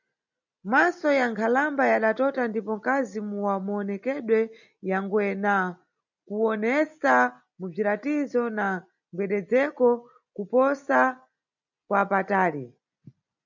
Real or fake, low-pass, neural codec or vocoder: real; 7.2 kHz; none